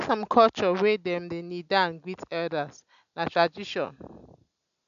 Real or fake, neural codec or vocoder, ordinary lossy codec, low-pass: real; none; none; 7.2 kHz